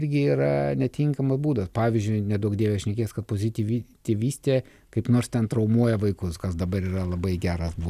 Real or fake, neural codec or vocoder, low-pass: real; none; 14.4 kHz